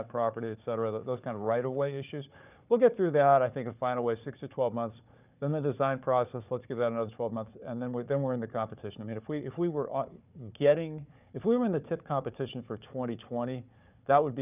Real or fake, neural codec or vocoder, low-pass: fake; codec, 16 kHz, 4 kbps, FunCodec, trained on Chinese and English, 50 frames a second; 3.6 kHz